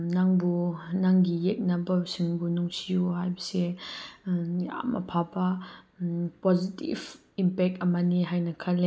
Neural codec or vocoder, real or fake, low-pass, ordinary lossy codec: none; real; none; none